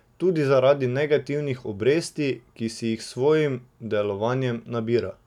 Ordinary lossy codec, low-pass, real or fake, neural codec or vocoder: none; 19.8 kHz; real; none